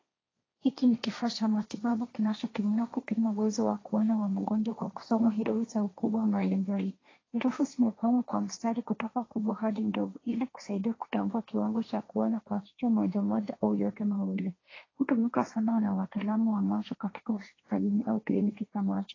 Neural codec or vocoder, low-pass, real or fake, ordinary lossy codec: codec, 16 kHz, 1.1 kbps, Voila-Tokenizer; 7.2 kHz; fake; AAC, 32 kbps